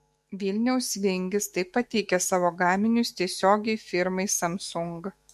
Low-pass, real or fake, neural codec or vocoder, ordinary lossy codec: 14.4 kHz; fake; autoencoder, 48 kHz, 128 numbers a frame, DAC-VAE, trained on Japanese speech; MP3, 64 kbps